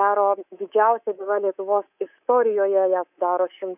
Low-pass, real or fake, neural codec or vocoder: 3.6 kHz; fake; codec, 24 kHz, 3.1 kbps, DualCodec